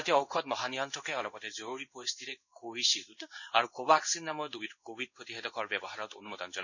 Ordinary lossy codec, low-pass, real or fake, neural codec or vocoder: none; 7.2 kHz; fake; codec, 16 kHz in and 24 kHz out, 1 kbps, XY-Tokenizer